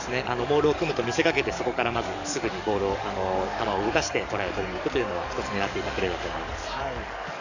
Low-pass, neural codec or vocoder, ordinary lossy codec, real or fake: 7.2 kHz; codec, 44.1 kHz, 7.8 kbps, DAC; none; fake